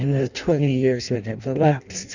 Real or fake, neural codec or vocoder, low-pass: fake; codec, 16 kHz in and 24 kHz out, 0.6 kbps, FireRedTTS-2 codec; 7.2 kHz